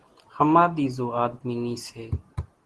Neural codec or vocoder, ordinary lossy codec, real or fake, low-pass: none; Opus, 16 kbps; real; 10.8 kHz